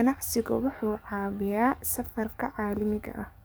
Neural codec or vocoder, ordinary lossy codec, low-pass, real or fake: codec, 44.1 kHz, 7.8 kbps, DAC; none; none; fake